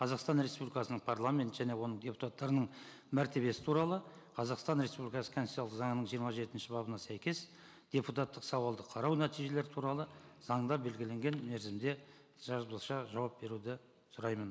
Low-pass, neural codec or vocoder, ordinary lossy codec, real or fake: none; none; none; real